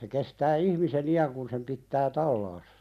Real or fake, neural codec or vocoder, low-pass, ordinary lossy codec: real; none; 14.4 kHz; none